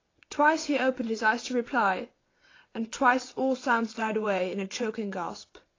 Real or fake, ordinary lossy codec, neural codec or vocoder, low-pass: fake; AAC, 32 kbps; vocoder, 22.05 kHz, 80 mel bands, WaveNeXt; 7.2 kHz